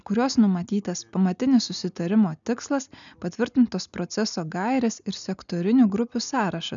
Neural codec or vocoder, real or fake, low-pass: none; real; 7.2 kHz